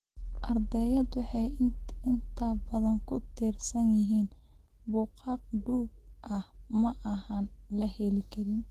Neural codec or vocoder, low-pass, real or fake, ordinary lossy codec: autoencoder, 48 kHz, 128 numbers a frame, DAC-VAE, trained on Japanese speech; 14.4 kHz; fake; Opus, 16 kbps